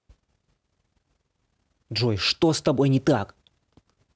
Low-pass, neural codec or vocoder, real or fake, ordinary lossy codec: none; none; real; none